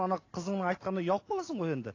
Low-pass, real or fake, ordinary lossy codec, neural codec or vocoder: 7.2 kHz; real; AAC, 32 kbps; none